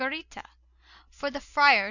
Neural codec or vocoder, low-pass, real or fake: none; 7.2 kHz; real